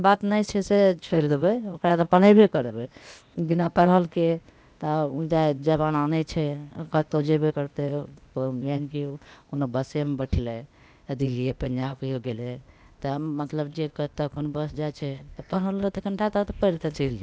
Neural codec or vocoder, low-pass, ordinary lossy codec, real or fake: codec, 16 kHz, 0.8 kbps, ZipCodec; none; none; fake